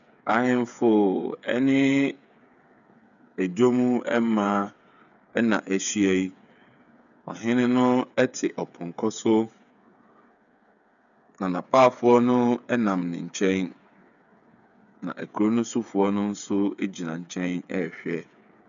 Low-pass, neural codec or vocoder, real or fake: 7.2 kHz; codec, 16 kHz, 8 kbps, FreqCodec, smaller model; fake